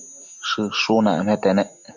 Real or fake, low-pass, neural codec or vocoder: real; 7.2 kHz; none